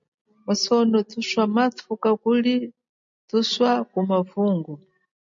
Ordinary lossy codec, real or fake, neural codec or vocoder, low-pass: MP3, 48 kbps; real; none; 7.2 kHz